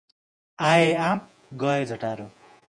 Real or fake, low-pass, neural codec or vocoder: fake; 9.9 kHz; vocoder, 48 kHz, 128 mel bands, Vocos